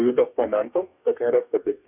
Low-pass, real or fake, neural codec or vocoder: 3.6 kHz; fake; codec, 44.1 kHz, 2.6 kbps, DAC